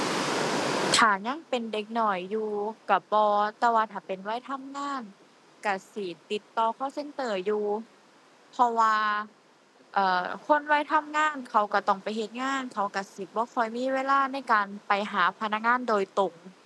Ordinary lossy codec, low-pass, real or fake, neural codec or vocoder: none; none; real; none